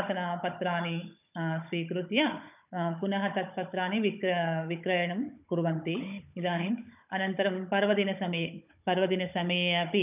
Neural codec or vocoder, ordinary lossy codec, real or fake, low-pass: codec, 16 kHz, 16 kbps, FunCodec, trained on Chinese and English, 50 frames a second; none; fake; 3.6 kHz